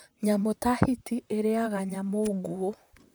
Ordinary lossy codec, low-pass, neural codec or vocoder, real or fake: none; none; vocoder, 44.1 kHz, 128 mel bands, Pupu-Vocoder; fake